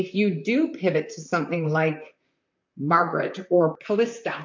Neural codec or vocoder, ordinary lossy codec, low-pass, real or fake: vocoder, 44.1 kHz, 128 mel bands, Pupu-Vocoder; MP3, 48 kbps; 7.2 kHz; fake